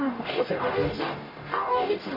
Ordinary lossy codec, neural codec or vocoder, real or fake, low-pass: MP3, 24 kbps; codec, 44.1 kHz, 0.9 kbps, DAC; fake; 5.4 kHz